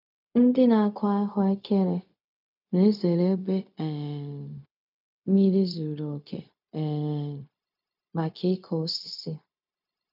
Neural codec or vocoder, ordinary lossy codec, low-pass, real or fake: codec, 16 kHz, 0.4 kbps, LongCat-Audio-Codec; none; 5.4 kHz; fake